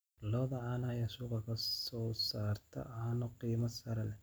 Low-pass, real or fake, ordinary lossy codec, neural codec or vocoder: none; real; none; none